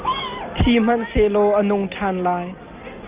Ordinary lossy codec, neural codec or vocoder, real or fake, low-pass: Opus, 24 kbps; none; real; 3.6 kHz